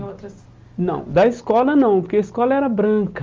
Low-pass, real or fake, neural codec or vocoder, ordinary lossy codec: 7.2 kHz; real; none; Opus, 32 kbps